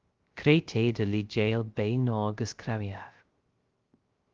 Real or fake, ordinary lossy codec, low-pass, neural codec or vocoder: fake; Opus, 32 kbps; 7.2 kHz; codec, 16 kHz, 0.3 kbps, FocalCodec